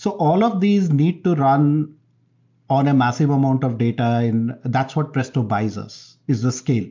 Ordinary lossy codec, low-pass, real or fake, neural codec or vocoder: MP3, 64 kbps; 7.2 kHz; real; none